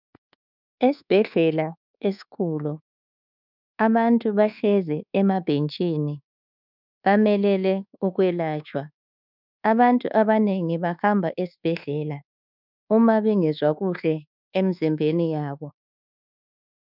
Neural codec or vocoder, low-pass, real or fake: codec, 24 kHz, 1.2 kbps, DualCodec; 5.4 kHz; fake